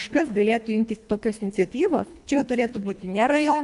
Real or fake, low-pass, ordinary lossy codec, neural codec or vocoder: fake; 10.8 kHz; AAC, 64 kbps; codec, 24 kHz, 1.5 kbps, HILCodec